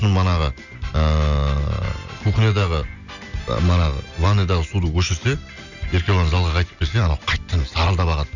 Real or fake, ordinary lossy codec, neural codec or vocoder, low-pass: real; none; none; 7.2 kHz